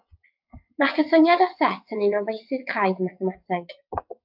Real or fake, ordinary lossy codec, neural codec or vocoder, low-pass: fake; AAC, 48 kbps; vocoder, 22.05 kHz, 80 mel bands, WaveNeXt; 5.4 kHz